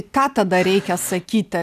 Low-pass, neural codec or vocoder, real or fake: 14.4 kHz; none; real